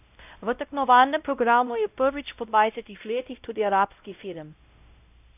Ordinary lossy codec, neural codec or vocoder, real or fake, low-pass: none; codec, 16 kHz, 0.5 kbps, X-Codec, WavLM features, trained on Multilingual LibriSpeech; fake; 3.6 kHz